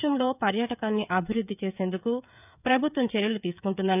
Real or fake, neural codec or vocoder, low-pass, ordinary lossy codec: fake; codec, 16 kHz, 16 kbps, FreqCodec, smaller model; 3.6 kHz; none